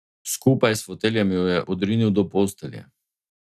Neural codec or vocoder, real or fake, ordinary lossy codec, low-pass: none; real; none; 14.4 kHz